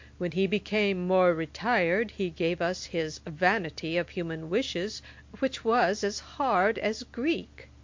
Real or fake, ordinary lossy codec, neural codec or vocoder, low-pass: real; MP3, 48 kbps; none; 7.2 kHz